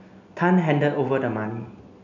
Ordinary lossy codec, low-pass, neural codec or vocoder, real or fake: AAC, 48 kbps; 7.2 kHz; none; real